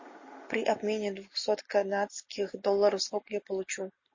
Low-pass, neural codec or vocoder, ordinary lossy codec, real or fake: 7.2 kHz; none; MP3, 32 kbps; real